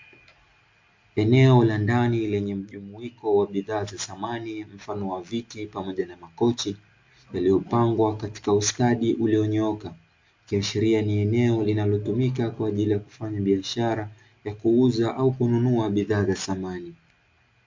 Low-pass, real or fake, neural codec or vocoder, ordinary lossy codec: 7.2 kHz; real; none; MP3, 48 kbps